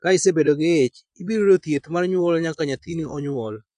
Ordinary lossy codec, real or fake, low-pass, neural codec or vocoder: none; fake; 9.9 kHz; vocoder, 22.05 kHz, 80 mel bands, Vocos